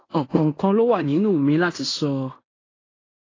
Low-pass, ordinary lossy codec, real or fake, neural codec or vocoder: 7.2 kHz; AAC, 32 kbps; fake; codec, 16 kHz in and 24 kHz out, 0.9 kbps, LongCat-Audio-Codec, fine tuned four codebook decoder